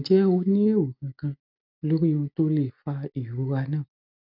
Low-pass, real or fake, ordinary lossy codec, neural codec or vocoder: 5.4 kHz; real; none; none